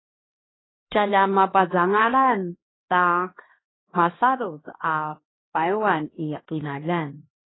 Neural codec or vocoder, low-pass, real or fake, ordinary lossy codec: codec, 16 kHz, 1 kbps, X-Codec, HuBERT features, trained on LibriSpeech; 7.2 kHz; fake; AAC, 16 kbps